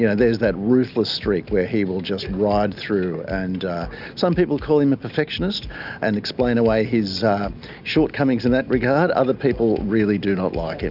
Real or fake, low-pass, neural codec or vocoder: real; 5.4 kHz; none